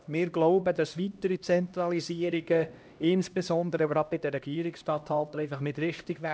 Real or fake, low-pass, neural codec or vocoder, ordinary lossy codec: fake; none; codec, 16 kHz, 1 kbps, X-Codec, HuBERT features, trained on LibriSpeech; none